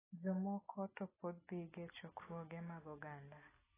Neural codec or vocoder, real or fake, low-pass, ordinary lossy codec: none; real; 3.6 kHz; AAC, 16 kbps